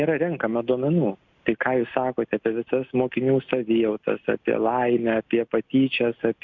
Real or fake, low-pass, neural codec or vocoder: real; 7.2 kHz; none